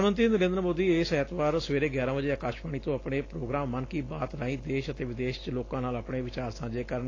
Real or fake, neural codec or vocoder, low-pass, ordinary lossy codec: real; none; 7.2 kHz; AAC, 32 kbps